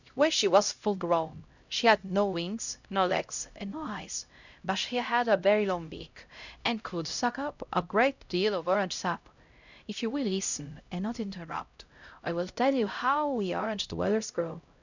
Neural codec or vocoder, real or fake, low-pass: codec, 16 kHz, 0.5 kbps, X-Codec, HuBERT features, trained on LibriSpeech; fake; 7.2 kHz